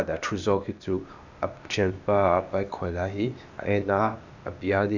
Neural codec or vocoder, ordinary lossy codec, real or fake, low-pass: codec, 16 kHz, 0.8 kbps, ZipCodec; none; fake; 7.2 kHz